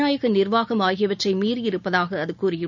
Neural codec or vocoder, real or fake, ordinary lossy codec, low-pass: none; real; none; 7.2 kHz